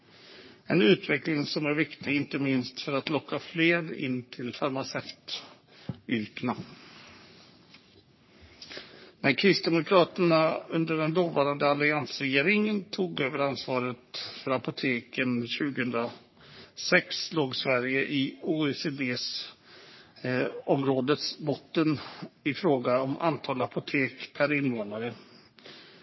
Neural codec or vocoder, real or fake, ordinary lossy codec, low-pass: codec, 44.1 kHz, 3.4 kbps, Pupu-Codec; fake; MP3, 24 kbps; 7.2 kHz